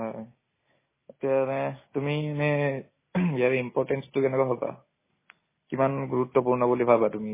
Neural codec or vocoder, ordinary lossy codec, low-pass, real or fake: none; MP3, 16 kbps; 3.6 kHz; real